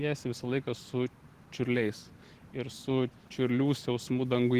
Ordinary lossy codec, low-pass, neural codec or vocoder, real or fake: Opus, 16 kbps; 14.4 kHz; none; real